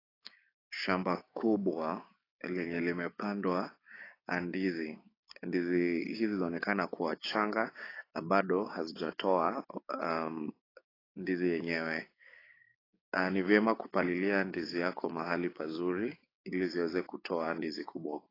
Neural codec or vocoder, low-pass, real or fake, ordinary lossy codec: codec, 16 kHz, 6 kbps, DAC; 5.4 kHz; fake; AAC, 24 kbps